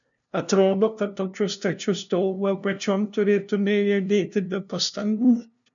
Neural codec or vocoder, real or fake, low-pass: codec, 16 kHz, 0.5 kbps, FunCodec, trained on LibriTTS, 25 frames a second; fake; 7.2 kHz